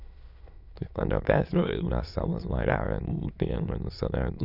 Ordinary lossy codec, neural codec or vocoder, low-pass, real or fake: none; autoencoder, 22.05 kHz, a latent of 192 numbers a frame, VITS, trained on many speakers; 5.4 kHz; fake